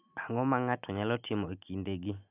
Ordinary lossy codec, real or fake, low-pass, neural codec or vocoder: none; real; 3.6 kHz; none